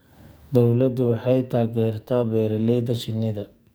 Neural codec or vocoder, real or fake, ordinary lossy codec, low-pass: codec, 44.1 kHz, 2.6 kbps, SNAC; fake; none; none